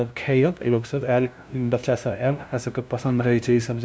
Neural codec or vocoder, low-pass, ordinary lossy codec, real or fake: codec, 16 kHz, 0.5 kbps, FunCodec, trained on LibriTTS, 25 frames a second; none; none; fake